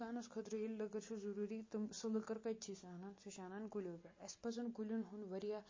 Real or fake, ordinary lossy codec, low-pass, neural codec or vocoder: real; MP3, 32 kbps; 7.2 kHz; none